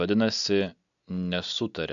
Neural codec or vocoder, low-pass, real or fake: none; 7.2 kHz; real